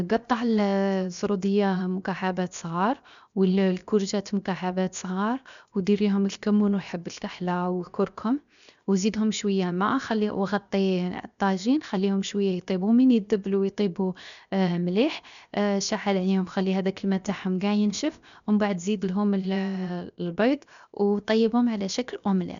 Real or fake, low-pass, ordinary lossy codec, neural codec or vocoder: fake; 7.2 kHz; none; codec, 16 kHz, 0.7 kbps, FocalCodec